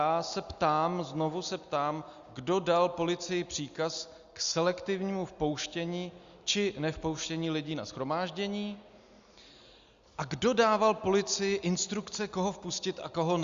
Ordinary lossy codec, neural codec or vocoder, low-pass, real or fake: Opus, 64 kbps; none; 7.2 kHz; real